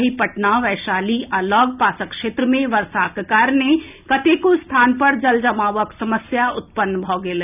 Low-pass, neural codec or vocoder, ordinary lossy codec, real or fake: 3.6 kHz; none; none; real